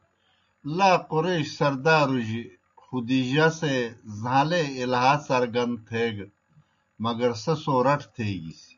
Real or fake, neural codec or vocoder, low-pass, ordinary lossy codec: real; none; 7.2 kHz; AAC, 64 kbps